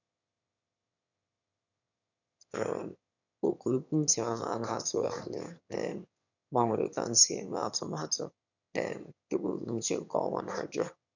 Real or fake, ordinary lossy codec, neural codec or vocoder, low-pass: fake; none; autoencoder, 22.05 kHz, a latent of 192 numbers a frame, VITS, trained on one speaker; 7.2 kHz